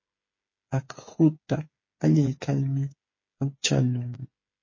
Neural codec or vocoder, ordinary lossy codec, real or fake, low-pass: codec, 16 kHz, 8 kbps, FreqCodec, smaller model; MP3, 32 kbps; fake; 7.2 kHz